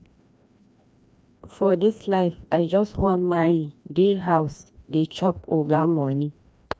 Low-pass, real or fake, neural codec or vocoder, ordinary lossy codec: none; fake; codec, 16 kHz, 1 kbps, FreqCodec, larger model; none